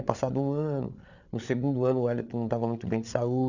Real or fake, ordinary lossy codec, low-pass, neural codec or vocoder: fake; none; 7.2 kHz; codec, 16 kHz, 8 kbps, FreqCodec, larger model